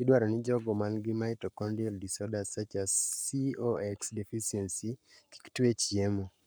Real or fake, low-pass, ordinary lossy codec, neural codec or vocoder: fake; none; none; codec, 44.1 kHz, 7.8 kbps, Pupu-Codec